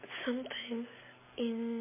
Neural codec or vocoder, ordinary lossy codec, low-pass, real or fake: none; MP3, 16 kbps; 3.6 kHz; real